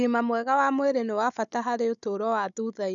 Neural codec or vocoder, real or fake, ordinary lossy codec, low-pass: codec, 16 kHz, 16 kbps, FunCodec, trained on Chinese and English, 50 frames a second; fake; none; 7.2 kHz